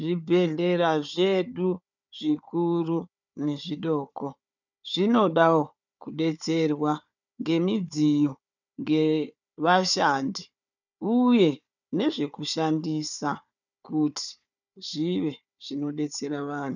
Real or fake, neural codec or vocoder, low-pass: fake; codec, 16 kHz, 4 kbps, FunCodec, trained on Chinese and English, 50 frames a second; 7.2 kHz